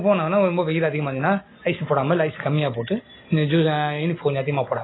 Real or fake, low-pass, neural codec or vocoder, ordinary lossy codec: real; 7.2 kHz; none; AAC, 16 kbps